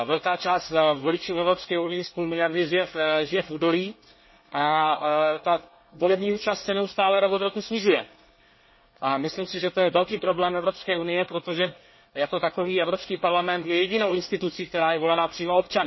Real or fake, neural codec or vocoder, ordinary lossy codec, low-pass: fake; codec, 24 kHz, 1 kbps, SNAC; MP3, 24 kbps; 7.2 kHz